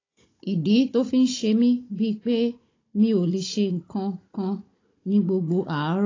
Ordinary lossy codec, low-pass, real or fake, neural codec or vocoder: AAC, 32 kbps; 7.2 kHz; fake; codec, 16 kHz, 16 kbps, FunCodec, trained on Chinese and English, 50 frames a second